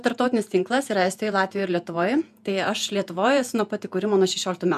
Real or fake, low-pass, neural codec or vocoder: fake; 14.4 kHz; vocoder, 48 kHz, 128 mel bands, Vocos